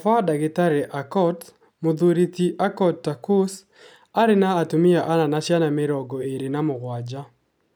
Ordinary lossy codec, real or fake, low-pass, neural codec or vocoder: none; real; none; none